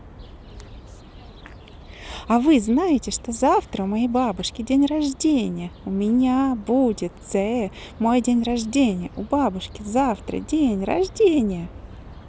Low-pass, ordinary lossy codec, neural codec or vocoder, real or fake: none; none; none; real